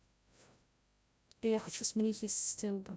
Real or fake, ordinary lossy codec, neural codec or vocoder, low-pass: fake; none; codec, 16 kHz, 0.5 kbps, FreqCodec, larger model; none